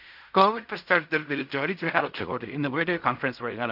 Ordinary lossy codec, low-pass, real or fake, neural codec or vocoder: none; 5.4 kHz; fake; codec, 16 kHz in and 24 kHz out, 0.4 kbps, LongCat-Audio-Codec, fine tuned four codebook decoder